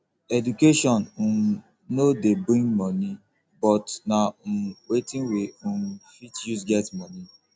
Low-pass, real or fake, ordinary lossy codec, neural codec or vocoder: none; real; none; none